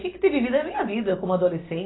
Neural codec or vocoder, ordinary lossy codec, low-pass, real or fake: none; AAC, 16 kbps; 7.2 kHz; real